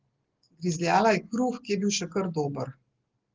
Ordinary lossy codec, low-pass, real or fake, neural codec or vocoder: Opus, 16 kbps; 7.2 kHz; real; none